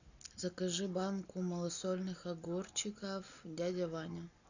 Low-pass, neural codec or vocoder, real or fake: 7.2 kHz; none; real